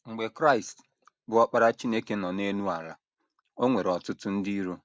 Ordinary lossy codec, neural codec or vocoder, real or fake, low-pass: none; none; real; none